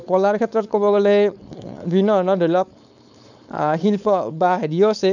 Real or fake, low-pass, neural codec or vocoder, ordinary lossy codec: fake; 7.2 kHz; codec, 16 kHz, 4.8 kbps, FACodec; none